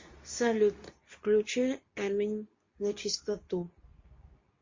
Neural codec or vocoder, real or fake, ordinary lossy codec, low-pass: codec, 24 kHz, 0.9 kbps, WavTokenizer, medium speech release version 2; fake; MP3, 32 kbps; 7.2 kHz